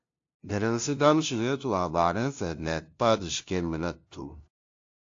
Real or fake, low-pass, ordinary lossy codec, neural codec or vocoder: fake; 7.2 kHz; AAC, 48 kbps; codec, 16 kHz, 0.5 kbps, FunCodec, trained on LibriTTS, 25 frames a second